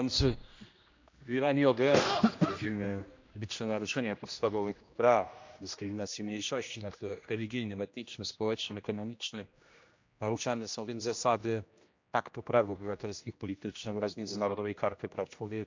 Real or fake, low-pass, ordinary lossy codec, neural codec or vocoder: fake; 7.2 kHz; MP3, 64 kbps; codec, 16 kHz, 1 kbps, X-Codec, HuBERT features, trained on general audio